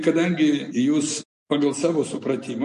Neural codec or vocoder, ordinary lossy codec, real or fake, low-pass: none; MP3, 48 kbps; real; 14.4 kHz